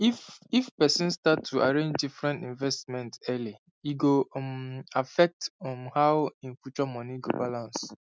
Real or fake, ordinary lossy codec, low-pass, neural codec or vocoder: real; none; none; none